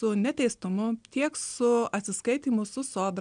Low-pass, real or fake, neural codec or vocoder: 9.9 kHz; real; none